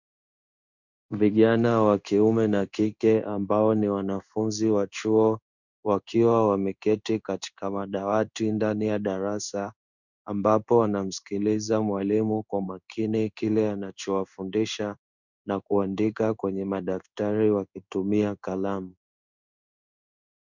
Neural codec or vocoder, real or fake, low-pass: codec, 16 kHz in and 24 kHz out, 1 kbps, XY-Tokenizer; fake; 7.2 kHz